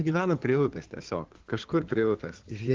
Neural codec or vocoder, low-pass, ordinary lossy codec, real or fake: codec, 16 kHz in and 24 kHz out, 2.2 kbps, FireRedTTS-2 codec; 7.2 kHz; Opus, 16 kbps; fake